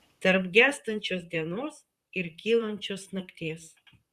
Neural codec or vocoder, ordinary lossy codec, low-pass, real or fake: vocoder, 44.1 kHz, 128 mel bands, Pupu-Vocoder; Opus, 64 kbps; 14.4 kHz; fake